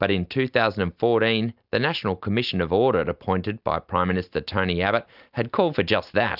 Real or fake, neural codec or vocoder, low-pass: real; none; 5.4 kHz